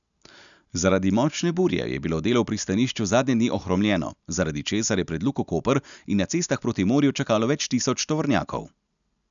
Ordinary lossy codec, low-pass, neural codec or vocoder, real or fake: none; 7.2 kHz; none; real